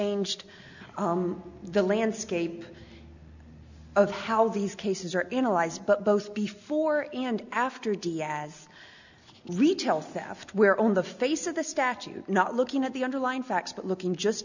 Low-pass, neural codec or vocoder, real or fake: 7.2 kHz; none; real